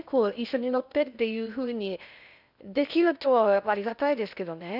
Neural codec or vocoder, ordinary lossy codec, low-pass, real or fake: codec, 16 kHz in and 24 kHz out, 0.6 kbps, FocalCodec, streaming, 2048 codes; none; 5.4 kHz; fake